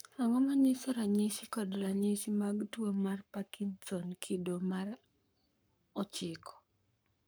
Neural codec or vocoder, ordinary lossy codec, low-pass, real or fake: codec, 44.1 kHz, 7.8 kbps, Pupu-Codec; none; none; fake